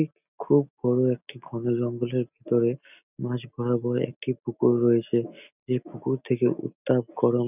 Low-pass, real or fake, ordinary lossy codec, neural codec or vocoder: 3.6 kHz; real; none; none